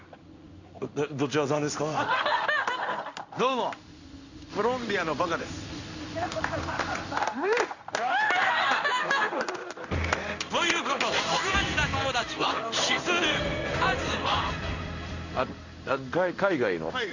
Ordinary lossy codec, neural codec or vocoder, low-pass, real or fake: none; codec, 16 kHz in and 24 kHz out, 1 kbps, XY-Tokenizer; 7.2 kHz; fake